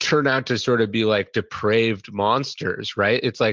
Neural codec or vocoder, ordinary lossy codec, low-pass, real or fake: none; Opus, 24 kbps; 7.2 kHz; real